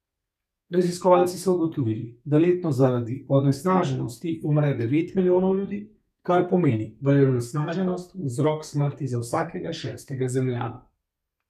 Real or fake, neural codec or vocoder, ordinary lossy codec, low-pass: fake; codec, 32 kHz, 1.9 kbps, SNAC; none; 14.4 kHz